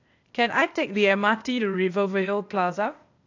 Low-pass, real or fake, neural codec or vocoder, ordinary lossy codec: 7.2 kHz; fake; codec, 16 kHz, 0.8 kbps, ZipCodec; AAC, 48 kbps